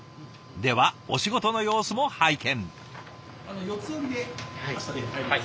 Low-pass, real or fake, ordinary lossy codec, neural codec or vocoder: none; real; none; none